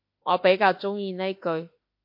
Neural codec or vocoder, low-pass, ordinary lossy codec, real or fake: autoencoder, 48 kHz, 32 numbers a frame, DAC-VAE, trained on Japanese speech; 5.4 kHz; MP3, 32 kbps; fake